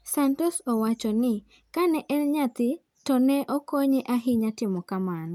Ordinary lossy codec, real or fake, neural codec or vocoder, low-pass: none; real; none; 19.8 kHz